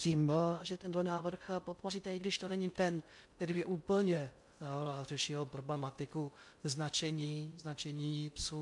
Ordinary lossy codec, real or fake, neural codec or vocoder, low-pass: MP3, 64 kbps; fake; codec, 16 kHz in and 24 kHz out, 0.6 kbps, FocalCodec, streaming, 2048 codes; 10.8 kHz